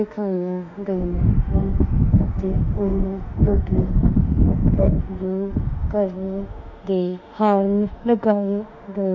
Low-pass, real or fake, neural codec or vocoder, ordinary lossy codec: 7.2 kHz; fake; autoencoder, 48 kHz, 32 numbers a frame, DAC-VAE, trained on Japanese speech; none